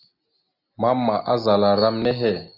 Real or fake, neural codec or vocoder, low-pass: real; none; 5.4 kHz